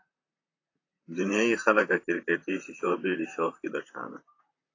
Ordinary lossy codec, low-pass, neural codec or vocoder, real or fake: AAC, 32 kbps; 7.2 kHz; vocoder, 44.1 kHz, 128 mel bands, Pupu-Vocoder; fake